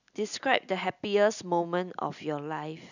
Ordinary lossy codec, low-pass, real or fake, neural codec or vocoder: none; 7.2 kHz; real; none